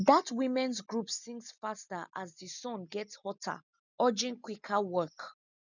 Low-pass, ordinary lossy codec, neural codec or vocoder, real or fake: 7.2 kHz; none; none; real